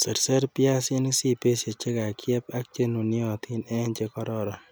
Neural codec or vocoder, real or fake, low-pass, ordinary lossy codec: none; real; none; none